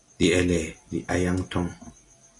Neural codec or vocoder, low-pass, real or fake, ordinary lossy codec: none; 10.8 kHz; real; AAC, 32 kbps